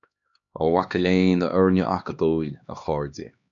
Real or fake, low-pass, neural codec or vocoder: fake; 7.2 kHz; codec, 16 kHz, 2 kbps, X-Codec, HuBERT features, trained on LibriSpeech